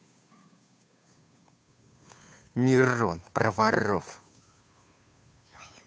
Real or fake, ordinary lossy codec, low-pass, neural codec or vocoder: fake; none; none; codec, 16 kHz, 2 kbps, FunCodec, trained on Chinese and English, 25 frames a second